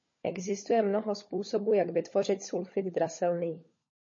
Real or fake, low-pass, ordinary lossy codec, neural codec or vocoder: fake; 7.2 kHz; MP3, 32 kbps; codec, 16 kHz, 16 kbps, FunCodec, trained on LibriTTS, 50 frames a second